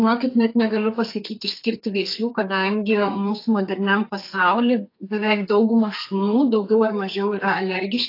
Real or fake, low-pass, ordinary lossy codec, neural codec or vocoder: fake; 5.4 kHz; AAC, 32 kbps; codec, 32 kHz, 1.9 kbps, SNAC